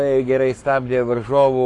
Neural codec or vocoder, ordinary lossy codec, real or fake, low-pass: codec, 44.1 kHz, 7.8 kbps, Pupu-Codec; AAC, 48 kbps; fake; 10.8 kHz